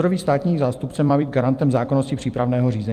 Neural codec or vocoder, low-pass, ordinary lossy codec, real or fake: vocoder, 44.1 kHz, 128 mel bands every 256 samples, BigVGAN v2; 14.4 kHz; Opus, 32 kbps; fake